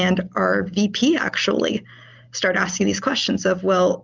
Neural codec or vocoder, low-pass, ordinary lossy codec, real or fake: none; 7.2 kHz; Opus, 24 kbps; real